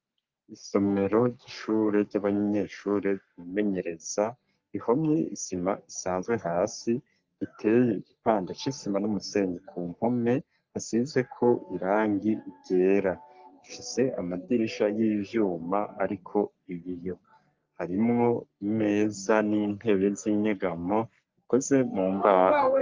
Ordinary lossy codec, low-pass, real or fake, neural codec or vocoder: Opus, 24 kbps; 7.2 kHz; fake; codec, 44.1 kHz, 3.4 kbps, Pupu-Codec